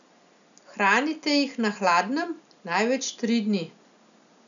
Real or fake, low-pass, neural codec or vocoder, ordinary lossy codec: real; 7.2 kHz; none; none